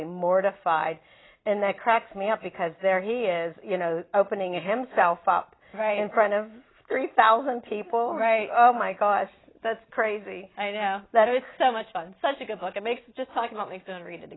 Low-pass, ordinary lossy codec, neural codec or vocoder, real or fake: 7.2 kHz; AAC, 16 kbps; none; real